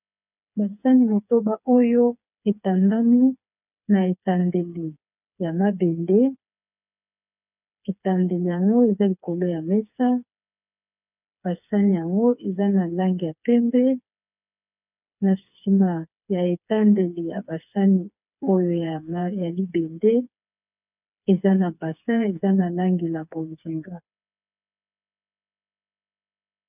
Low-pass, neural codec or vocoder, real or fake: 3.6 kHz; codec, 16 kHz, 4 kbps, FreqCodec, smaller model; fake